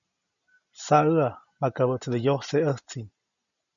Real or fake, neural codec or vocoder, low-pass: real; none; 7.2 kHz